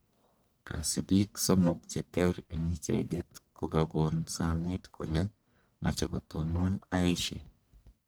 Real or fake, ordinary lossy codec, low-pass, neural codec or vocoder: fake; none; none; codec, 44.1 kHz, 1.7 kbps, Pupu-Codec